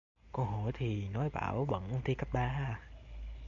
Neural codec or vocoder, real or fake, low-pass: none; real; 7.2 kHz